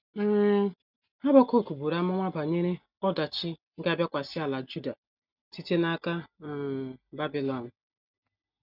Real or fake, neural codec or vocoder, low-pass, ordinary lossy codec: real; none; 5.4 kHz; none